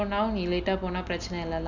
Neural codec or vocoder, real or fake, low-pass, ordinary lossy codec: none; real; 7.2 kHz; none